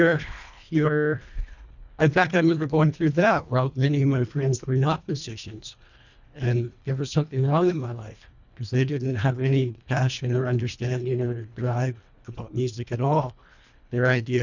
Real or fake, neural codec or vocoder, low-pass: fake; codec, 24 kHz, 1.5 kbps, HILCodec; 7.2 kHz